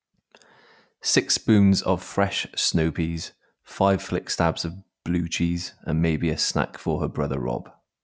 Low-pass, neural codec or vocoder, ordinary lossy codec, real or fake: none; none; none; real